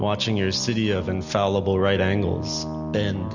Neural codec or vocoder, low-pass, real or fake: none; 7.2 kHz; real